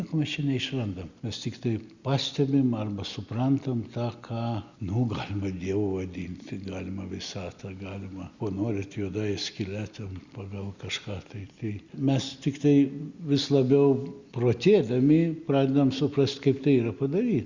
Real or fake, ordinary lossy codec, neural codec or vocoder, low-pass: real; Opus, 64 kbps; none; 7.2 kHz